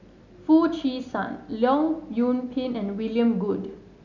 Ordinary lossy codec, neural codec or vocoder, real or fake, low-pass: none; none; real; 7.2 kHz